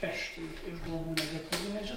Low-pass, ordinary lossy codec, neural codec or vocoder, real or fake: 19.8 kHz; MP3, 64 kbps; codec, 44.1 kHz, 7.8 kbps, DAC; fake